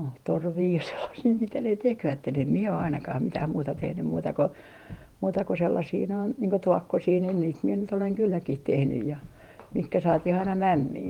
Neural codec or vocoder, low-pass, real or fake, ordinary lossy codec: vocoder, 48 kHz, 128 mel bands, Vocos; 19.8 kHz; fake; Opus, 24 kbps